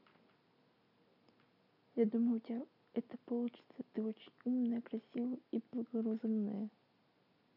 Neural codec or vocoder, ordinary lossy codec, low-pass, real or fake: none; none; 5.4 kHz; real